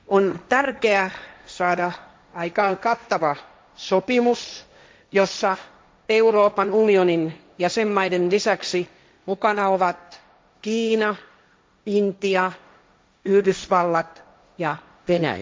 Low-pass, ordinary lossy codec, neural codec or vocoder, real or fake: none; none; codec, 16 kHz, 1.1 kbps, Voila-Tokenizer; fake